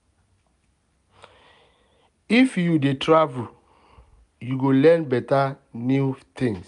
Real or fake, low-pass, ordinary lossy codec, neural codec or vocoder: real; 10.8 kHz; none; none